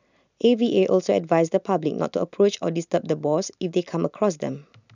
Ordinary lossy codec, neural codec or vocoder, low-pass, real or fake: none; none; 7.2 kHz; real